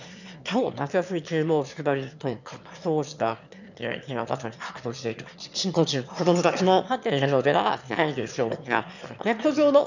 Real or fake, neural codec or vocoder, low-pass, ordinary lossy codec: fake; autoencoder, 22.05 kHz, a latent of 192 numbers a frame, VITS, trained on one speaker; 7.2 kHz; none